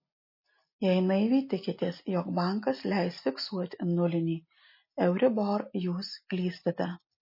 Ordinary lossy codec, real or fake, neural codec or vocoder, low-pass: MP3, 24 kbps; real; none; 5.4 kHz